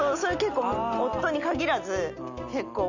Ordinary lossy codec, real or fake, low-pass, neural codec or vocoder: none; real; 7.2 kHz; none